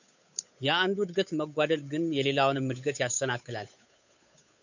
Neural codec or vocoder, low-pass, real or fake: codec, 16 kHz, 8 kbps, FunCodec, trained on Chinese and English, 25 frames a second; 7.2 kHz; fake